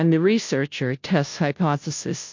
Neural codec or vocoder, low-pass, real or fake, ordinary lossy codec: codec, 16 kHz, 0.5 kbps, FunCodec, trained on Chinese and English, 25 frames a second; 7.2 kHz; fake; MP3, 48 kbps